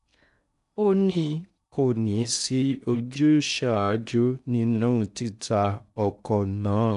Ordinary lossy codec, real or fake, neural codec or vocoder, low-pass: none; fake; codec, 16 kHz in and 24 kHz out, 0.6 kbps, FocalCodec, streaming, 2048 codes; 10.8 kHz